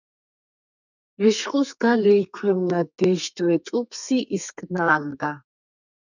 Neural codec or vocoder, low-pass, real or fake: codec, 32 kHz, 1.9 kbps, SNAC; 7.2 kHz; fake